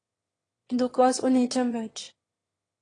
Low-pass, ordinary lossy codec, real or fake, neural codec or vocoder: 9.9 kHz; AAC, 32 kbps; fake; autoencoder, 22.05 kHz, a latent of 192 numbers a frame, VITS, trained on one speaker